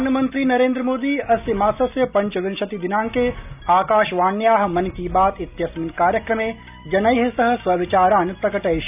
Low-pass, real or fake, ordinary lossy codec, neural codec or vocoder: 3.6 kHz; real; none; none